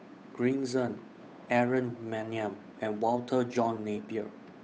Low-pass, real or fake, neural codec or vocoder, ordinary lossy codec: none; fake; codec, 16 kHz, 8 kbps, FunCodec, trained on Chinese and English, 25 frames a second; none